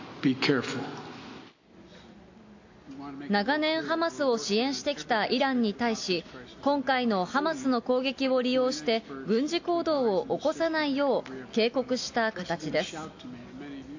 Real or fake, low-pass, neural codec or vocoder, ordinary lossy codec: real; 7.2 kHz; none; AAC, 48 kbps